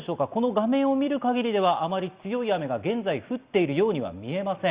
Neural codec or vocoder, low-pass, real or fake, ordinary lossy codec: none; 3.6 kHz; real; Opus, 64 kbps